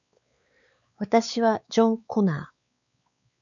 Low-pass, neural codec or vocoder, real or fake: 7.2 kHz; codec, 16 kHz, 4 kbps, X-Codec, WavLM features, trained on Multilingual LibriSpeech; fake